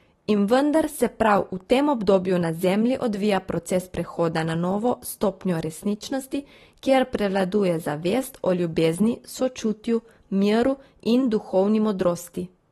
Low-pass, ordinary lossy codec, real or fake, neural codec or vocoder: 19.8 kHz; AAC, 32 kbps; real; none